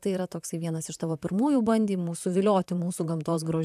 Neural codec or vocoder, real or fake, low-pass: vocoder, 44.1 kHz, 128 mel bands, Pupu-Vocoder; fake; 14.4 kHz